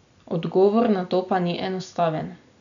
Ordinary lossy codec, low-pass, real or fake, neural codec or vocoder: none; 7.2 kHz; real; none